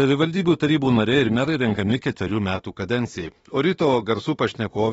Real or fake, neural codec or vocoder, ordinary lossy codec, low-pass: fake; autoencoder, 48 kHz, 32 numbers a frame, DAC-VAE, trained on Japanese speech; AAC, 24 kbps; 19.8 kHz